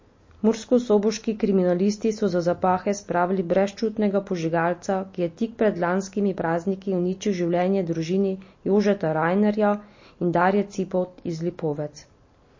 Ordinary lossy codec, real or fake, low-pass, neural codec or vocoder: MP3, 32 kbps; real; 7.2 kHz; none